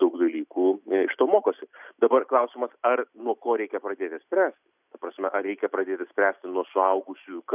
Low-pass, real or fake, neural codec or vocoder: 3.6 kHz; real; none